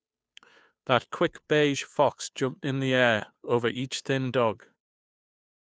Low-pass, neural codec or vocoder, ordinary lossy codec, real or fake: none; codec, 16 kHz, 2 kbps, FunCodec, trained on Chinese and English, 25 frames a second; none; fake